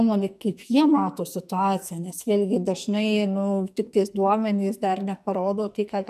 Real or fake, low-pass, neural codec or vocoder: fake; 14.4 kHz; codec, 44.1 kHz, 2.6 kbps, SNAC